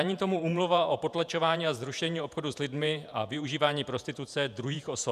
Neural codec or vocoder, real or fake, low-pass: vocoder, 48 kHz, 128 mel bands, Vocos; fake; 14.4 kHz